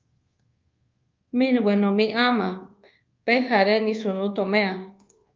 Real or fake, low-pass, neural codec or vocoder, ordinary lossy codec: fake; 7.2 kHz; codec, 24 kHz, 1.2 kbps, DualCodec; Opus, 32 kbps